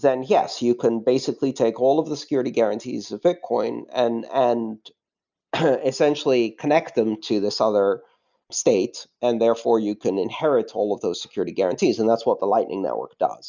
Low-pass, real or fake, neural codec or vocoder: 7.2 kHz; real; none